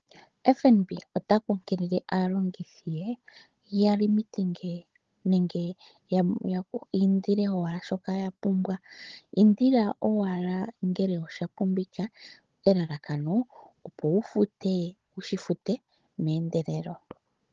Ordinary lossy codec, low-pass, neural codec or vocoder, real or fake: Opus, 16 kbps; 7.2 kHz; codec, 16 kHz, 16 kbps, FunCodec, trained on Chinese and English, 50 frames a second; fake